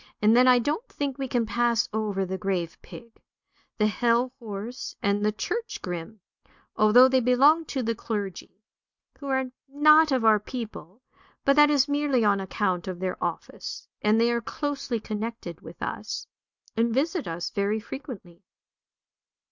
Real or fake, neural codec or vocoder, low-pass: real; none; 7.2 kHz